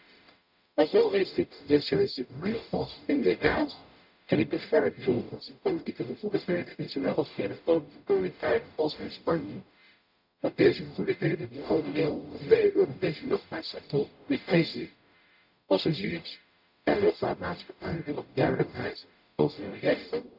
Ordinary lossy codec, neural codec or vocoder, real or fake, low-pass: none; codec, 44.1 kHz, 0.9 kbps, DAC; fake; 5.4 kHz